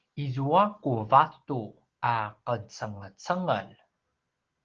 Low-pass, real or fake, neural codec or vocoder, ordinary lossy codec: 7.2 kHz; real; none; Opus, 16 kbps